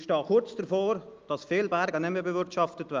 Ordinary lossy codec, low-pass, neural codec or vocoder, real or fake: Opus, 24 kbps; 7.2 kHz; none; real